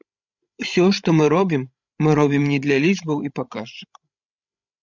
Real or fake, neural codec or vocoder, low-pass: fake; codec, 16 kHz, 16 kbps, FreqCodec, larger model; 7.2 kHz